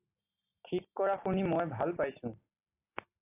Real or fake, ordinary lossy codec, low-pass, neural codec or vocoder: real; AAC, 24 kbps; 3.6 kHz; none